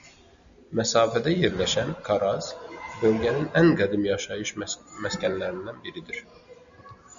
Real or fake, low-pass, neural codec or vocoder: real; 7.2 kHz; none